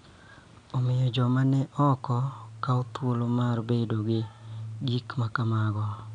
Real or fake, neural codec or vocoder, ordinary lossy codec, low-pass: real; none; none; 9.9 kHz